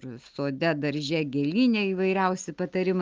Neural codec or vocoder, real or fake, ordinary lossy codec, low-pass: none; real; Opus, 32 kbps; 7.2 kHz